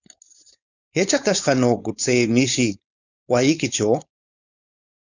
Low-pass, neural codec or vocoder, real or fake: 7.2 kHz; codec, 16 kHz, 4.8 kbps, FACodec; fake